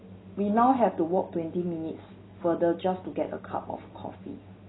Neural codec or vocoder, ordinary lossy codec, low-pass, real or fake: none; AAC, 16 kbps; 7.2 kHz; real